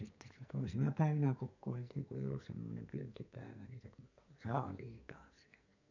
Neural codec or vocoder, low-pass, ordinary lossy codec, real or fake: codec, 44.1 kHz, 2.6 kbps, SNAC; 7.2 kHz; none; fake